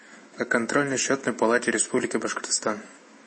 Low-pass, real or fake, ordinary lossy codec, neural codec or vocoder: 10.8 kHz; fake; MP3, 32 kbps; autoencoder, 48 kHz, 128 numbers a frame, DAC-VAE, trained on Japanese speech